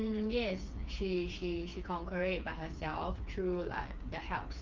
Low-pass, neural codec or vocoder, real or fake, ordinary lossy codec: 7.2 kHz; codec, 16 kHz, 4 kbps, FreqCodec, smaller model; fake; Opus, 24 kbps